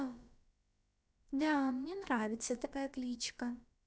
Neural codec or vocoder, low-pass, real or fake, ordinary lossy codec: codec, 16 kHz, about 1 kbps, DyCAST, with the encoder's durations; none; fake; none